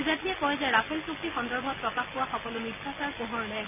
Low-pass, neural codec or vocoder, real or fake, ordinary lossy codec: 3.6 kHz; none; real; none